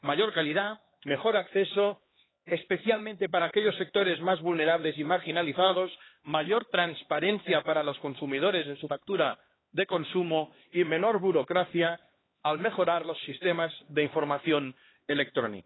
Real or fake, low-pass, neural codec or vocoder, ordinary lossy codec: fake; 7.2 kHz; codec, 16 kHz, 2 kbps, X-Codec, HuBERT features, trained on LibriSpeech; AAC, 16 kbps